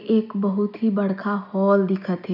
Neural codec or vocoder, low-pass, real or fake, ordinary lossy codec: none; 5.4 kHz; real; none